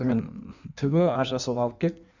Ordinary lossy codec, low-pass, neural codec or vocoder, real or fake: none; 7.2 kHz; codec, 16 kHz in and 24 kHz out, 1.1 kbps, FireRedTTS-2 codec; fake